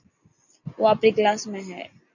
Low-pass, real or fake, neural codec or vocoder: 7.2 kHz; real; none